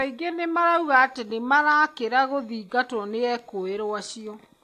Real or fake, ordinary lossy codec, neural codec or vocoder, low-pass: real; AAC, 64 kbps; none; 14.4 kHz